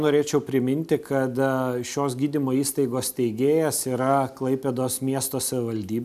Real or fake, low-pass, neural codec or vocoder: real; 14.4 kHz; none